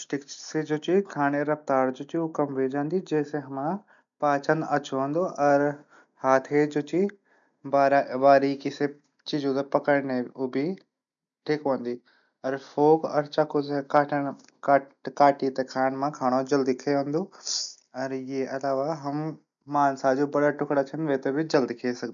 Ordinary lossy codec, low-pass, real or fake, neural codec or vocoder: none; 7.2 kHz; real; none